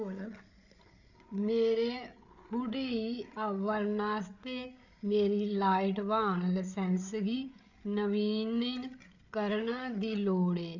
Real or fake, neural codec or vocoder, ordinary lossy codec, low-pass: fake; codec, 16 kHz, 8 kbps, FreqCodec, larger model; none; 7.2 kHz